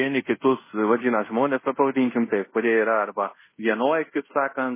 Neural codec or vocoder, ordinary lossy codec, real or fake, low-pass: codec, 24 kHz, 0.5 kbps, DualCodec; MP3, 16 kbps; fake; 3.6 kHz